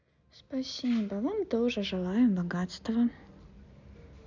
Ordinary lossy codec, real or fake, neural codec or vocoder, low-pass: none; real; none; 7.2 kHz